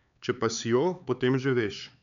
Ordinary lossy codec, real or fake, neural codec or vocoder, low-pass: none; fake; codec, 16 kHz, 4 kbps, X-Codec, HuBERT features, trained on LibriSpeech; 7.2 kHz